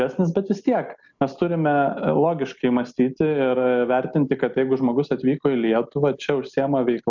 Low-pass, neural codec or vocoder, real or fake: 7.2 kHz; none; real